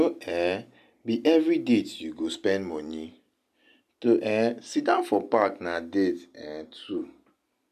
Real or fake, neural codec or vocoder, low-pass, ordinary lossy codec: real; none; 14.4 kHz; MP3, 96 kbps